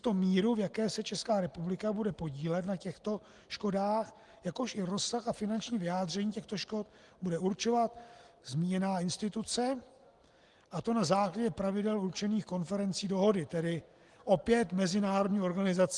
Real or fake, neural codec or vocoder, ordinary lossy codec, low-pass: real; none; Opus, 24 kbps; 10.8 kHz